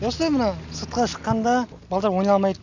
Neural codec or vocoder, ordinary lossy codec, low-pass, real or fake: none; none; 7.2 kHz; real